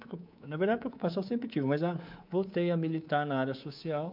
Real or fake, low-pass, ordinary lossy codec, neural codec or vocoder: fake; 5.4 kHz; MP3, 48 kbps; codec, 24 kHz, 3.1 kbps, DualCodec